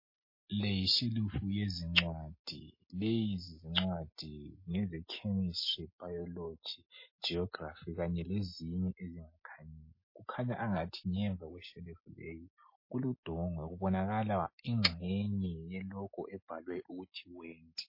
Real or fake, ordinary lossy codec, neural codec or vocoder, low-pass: real; MP3, 24 kbps; none; 5.4 kHz